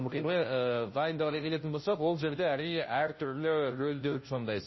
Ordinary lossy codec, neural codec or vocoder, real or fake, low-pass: MP3, 24 kbps; codec, 16 kHz, 0.5 kbps, FunCodec, trained on Chinese and English, 25 frames a second; fake; 7.2 kHz